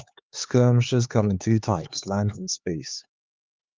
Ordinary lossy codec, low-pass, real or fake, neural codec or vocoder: Opus, 24 kbps; 7.2 kHz; fake; codec, 16 kHz, 4 kbps, X-Codec, HuBERT features, trained on LibriSpeech